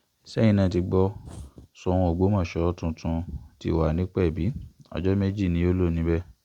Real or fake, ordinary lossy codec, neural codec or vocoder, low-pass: real; Opus, 64 kbps; none; 19.8 kHz